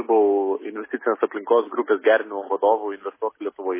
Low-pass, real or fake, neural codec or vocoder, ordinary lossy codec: 3.6 kHz; real; none; MP3, 16 kbps